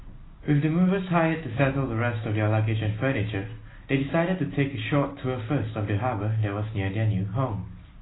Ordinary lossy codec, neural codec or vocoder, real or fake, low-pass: AAC, 16 kbps; none; real; 7.2 kHz